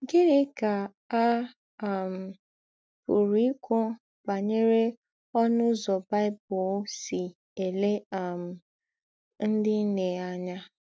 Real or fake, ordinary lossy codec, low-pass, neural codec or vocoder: real; none; none; none